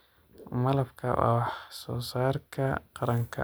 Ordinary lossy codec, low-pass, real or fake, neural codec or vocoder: none; none; real; none